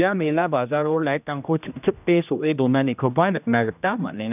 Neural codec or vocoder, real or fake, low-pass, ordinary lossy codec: codec, 16 kHz, 1 kbps, X-Codec, HuBERT features, trained on general audio; fake; 3.6 kHz; none